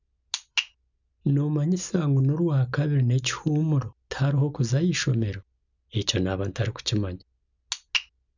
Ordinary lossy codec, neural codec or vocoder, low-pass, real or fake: none; none; 7.2 kHz; real